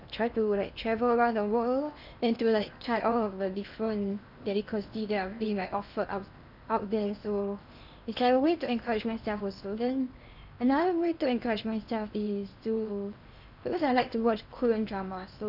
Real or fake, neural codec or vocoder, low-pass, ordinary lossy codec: fake; codec, 16 kHz in and 24 kHz out, 0.8 kbps, FocalCodec, streaming, 65536 codes; 5.4 kHz; none